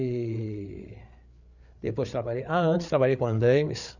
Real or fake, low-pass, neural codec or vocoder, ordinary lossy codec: fake; 7.2 kHz; codec, 16 kHz, 8 kbps, FreqCodec, larger model; none